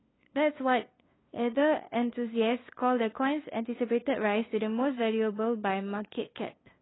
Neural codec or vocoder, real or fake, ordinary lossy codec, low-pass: codec, 16 kHz, 2 kbps, FunCodec, trained on LibriTTS, 25 frames a second; fake; AAC, 16 kbps; 7.2 kHz